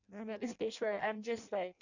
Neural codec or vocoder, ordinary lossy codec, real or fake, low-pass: codec, 16 kHz in and 24 kHz out, 0.6 kbps, FireRedTTS-2 codec; none; fake; 7.2 kHz